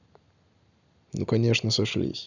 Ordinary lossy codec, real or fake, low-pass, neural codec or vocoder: none; real; 7.2 kHz; none